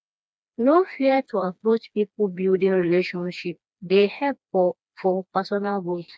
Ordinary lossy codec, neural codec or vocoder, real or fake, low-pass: none; codec, 16 kHz, 2 kbps, FreqCodec, smaller model; fake; none